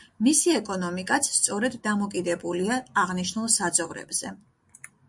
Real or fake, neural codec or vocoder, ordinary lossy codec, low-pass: real; none; MP3, 64 kbps; 10.8 kHz